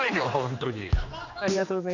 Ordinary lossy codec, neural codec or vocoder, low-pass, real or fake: none; codec, 16 kHz, 2 kbps, X-Codec, HuBERT features, trained on general audio; 7.2 kHz; fake